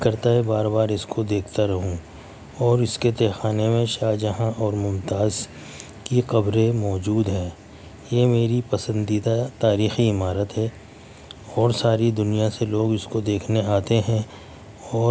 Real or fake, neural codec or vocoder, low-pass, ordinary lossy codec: real; none; none; none